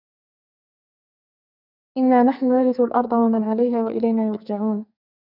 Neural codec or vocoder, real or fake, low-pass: codec, 44.1 kHz, 2.6 kbps, SNAC; fake; 5.4 kHz